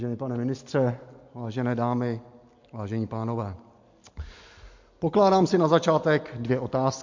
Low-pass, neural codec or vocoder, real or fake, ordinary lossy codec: 7.2 kHz; none; real; MP3, 48 kbps